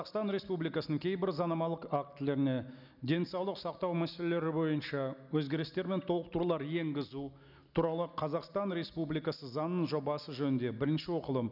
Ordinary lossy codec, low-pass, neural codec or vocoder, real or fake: none; 5.4 kHz; none; real